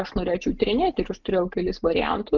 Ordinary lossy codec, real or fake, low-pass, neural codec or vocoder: Opus, 32 kbps; real; 7.2 kHz; none